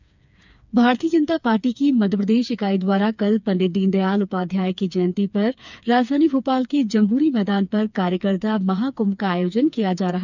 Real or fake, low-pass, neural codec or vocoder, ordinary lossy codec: fake; 7.2 kHz; codec, 16 kHz, 4 kbps, FreqCodec, smaller model; none